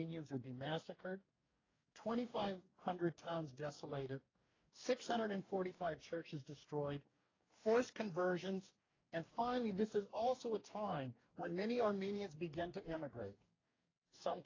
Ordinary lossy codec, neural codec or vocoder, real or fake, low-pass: AAC, 32 kbps; codec, 44.1 kHz, 2.6 kbps, DAC; fake; 7.2 kHz